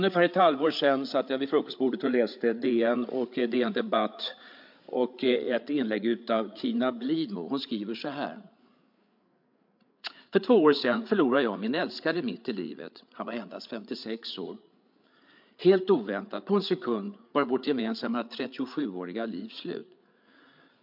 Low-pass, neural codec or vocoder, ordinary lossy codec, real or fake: 5.4 kHz; codec, 16 kHz, 8 kbps, FreqCodec, larger model; MP3, 48 kbps; fake